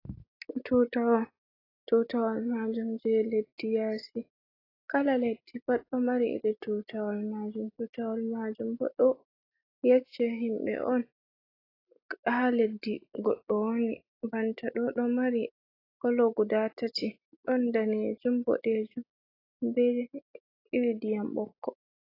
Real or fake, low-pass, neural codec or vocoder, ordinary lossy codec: real; 5.4 kHz; none; AAC, 24 kbps